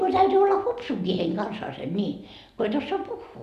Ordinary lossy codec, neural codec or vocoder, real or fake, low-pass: none; none; real; 14.4 kHz